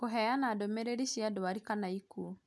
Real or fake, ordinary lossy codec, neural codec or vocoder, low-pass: real; none; none; none